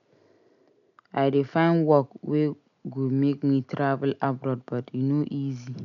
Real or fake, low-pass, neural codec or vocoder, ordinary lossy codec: real; 7.2 kHz; none; none